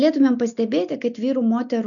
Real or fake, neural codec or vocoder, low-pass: real; none; 7.2 kHz